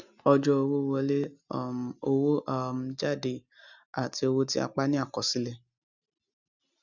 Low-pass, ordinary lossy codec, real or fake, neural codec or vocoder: 7.2 kHz; none; real; none